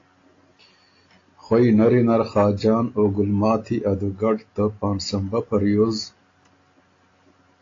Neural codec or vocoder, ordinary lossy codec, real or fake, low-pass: none; AAC, 32 kbps; real; 7.2 kHz